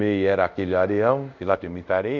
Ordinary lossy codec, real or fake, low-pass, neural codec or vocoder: none; fake; 7.2 kHz; codec, 24 kHz, 0.5 kbps, DualCodec